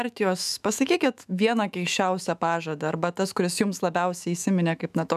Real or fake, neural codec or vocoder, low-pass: fake; autoencoder, 48 kHz, 128 numbers a frame, DAC-VAE, trained on Japanese speech; 14.4 kHz